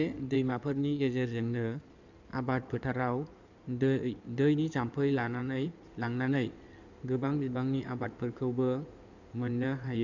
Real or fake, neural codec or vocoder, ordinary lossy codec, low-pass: fake; codec, 16 kHz in and 24 kHz out, 2.2 kbps, FireRedTTS-2 codec; none; 7.2 kHz